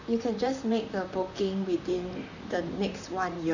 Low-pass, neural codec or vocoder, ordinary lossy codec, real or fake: 7.2 kHz; vocoder, 44.1 kHz, 128 mel bands, Pupu-Vocoder; none; fake